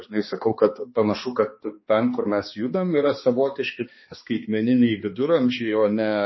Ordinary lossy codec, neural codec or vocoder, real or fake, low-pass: MP3, 24 kbps; codec, 16 kHz, 2 kbps, X-Codec, HuBERT features, trained on balanced general audio; fake; 7.2 kHz